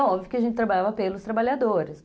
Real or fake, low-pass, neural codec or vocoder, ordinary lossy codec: real; none; none; none